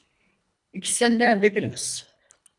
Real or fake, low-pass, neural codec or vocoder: fake; 10.8 kHz; codec, 24 kHz, 1.5 kbps, HILCodec